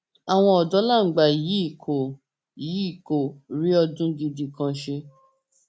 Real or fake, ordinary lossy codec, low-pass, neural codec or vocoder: real; none; none; none